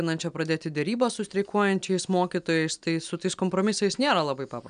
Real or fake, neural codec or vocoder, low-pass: real; none; 9.9 kHz